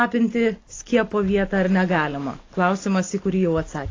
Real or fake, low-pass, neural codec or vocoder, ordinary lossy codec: real; 7.2 kHz; none; AAC, 32 kbps